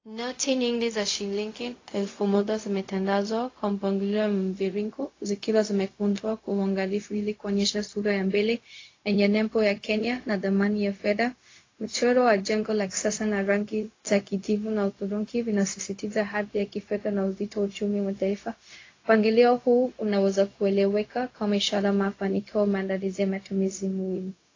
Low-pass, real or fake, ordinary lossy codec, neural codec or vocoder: 7.2 kHz; fake; AAC, 32 kbps; codec, 16 kHz, 0.4 kbps, LongCat-Audio-Codec